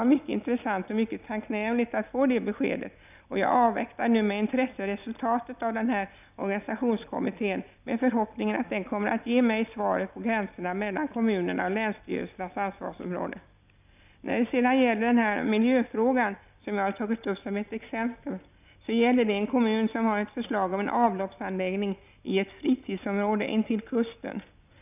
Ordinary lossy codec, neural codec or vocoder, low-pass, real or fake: none; none; 3.6 kHz; real